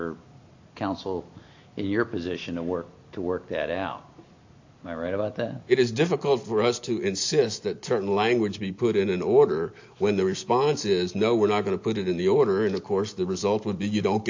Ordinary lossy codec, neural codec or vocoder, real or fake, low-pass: AAC, 48 kbps; none; real; 7.2 kHz